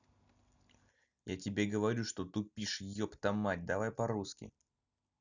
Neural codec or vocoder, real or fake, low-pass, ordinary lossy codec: none; real; 7.2 kHz; none